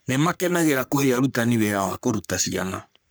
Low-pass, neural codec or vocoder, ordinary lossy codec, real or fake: none; codec, 44.1 kHz, 3.4 kbps, Pupu-Codec; none; fake